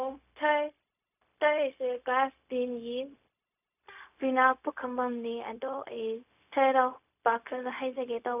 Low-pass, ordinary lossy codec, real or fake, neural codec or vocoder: 3.6 kHz; none; fake; codec, 16 kHz, 0.4 kbps, LongCat-Audio-Codec